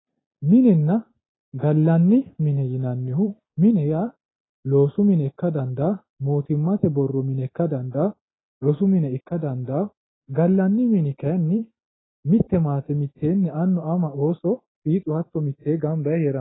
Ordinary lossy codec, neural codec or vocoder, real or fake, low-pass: AAC, 16 kbps; none; real; 7.2 kHz